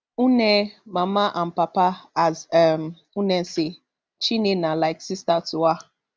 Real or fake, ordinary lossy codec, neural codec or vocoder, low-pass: real; none; none; none